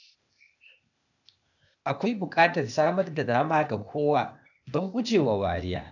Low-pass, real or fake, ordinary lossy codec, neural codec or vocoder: 7.2 kHz; fake; none; codec, 16 kHz, 0.8 kbps, ZipCodec